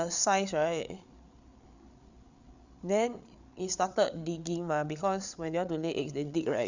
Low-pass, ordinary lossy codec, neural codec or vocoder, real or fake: 7.2 kHz; none; codec, 16 kHz, 8 kbps, FreqCodec, larger model; fake